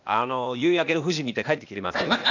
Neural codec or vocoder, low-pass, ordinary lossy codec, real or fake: codec, 16 kHz, 0.8 kbps, ZipCodec; 7.2 kHz; none; fake